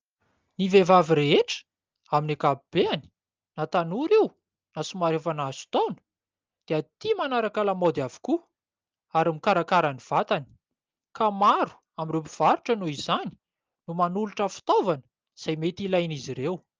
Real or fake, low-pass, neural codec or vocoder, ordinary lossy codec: real; 7.2 kHz; none; Opus, 24 kbps